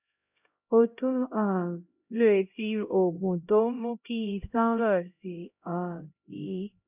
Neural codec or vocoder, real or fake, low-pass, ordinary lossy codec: codec, 16 kHz, 0.5 kbps, X-Codec, HuBERT features, trained on LibriSpeech; fake; 3.6 kHz; none